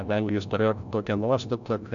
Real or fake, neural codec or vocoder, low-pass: fake; codec, 16 kHz, 0.5 kbps, FreqCodec, larger model; 7.2 kHz